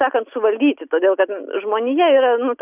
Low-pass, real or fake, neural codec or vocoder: 3.6 kHz; real; none